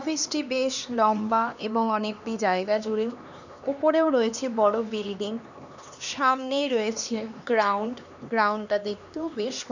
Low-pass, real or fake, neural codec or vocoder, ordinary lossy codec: 7.2 kHz; fake; codec, 16 kHz, 2 kbps, X-Codec, HuBERT features, trained on LibriSpeech; none